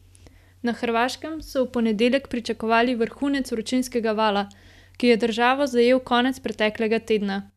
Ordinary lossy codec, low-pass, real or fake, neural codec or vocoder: none; 14.4 kHz; real; none